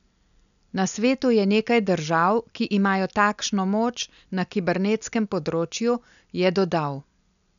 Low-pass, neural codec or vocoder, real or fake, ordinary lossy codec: 7.2 kHz; none; real; none